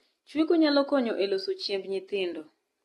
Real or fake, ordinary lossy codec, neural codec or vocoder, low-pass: real; AAC, 32 kbps; none; 14.4 kHz